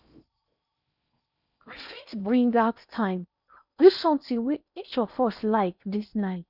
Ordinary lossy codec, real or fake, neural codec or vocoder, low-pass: none; fake; codec, 16 kHz in and 24 kHz out, 0.6 kbps, FocalCodec, streaming, 4096 codes; 5.4 kHz